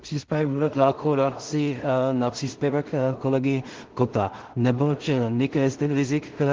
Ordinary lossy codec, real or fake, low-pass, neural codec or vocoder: Opus, 16 kbps; fake; 7.2 kHz; codec, 16 kHz in and 24 kHz out, 0.4 kbps, LongCat-Audio-Codec, two codebook decoder